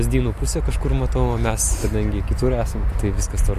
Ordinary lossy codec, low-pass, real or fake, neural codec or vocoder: MP3, 64 kbps; 14.4 kHz; real; none